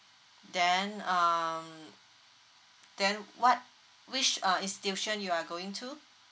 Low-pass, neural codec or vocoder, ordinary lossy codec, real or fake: none; none; none; real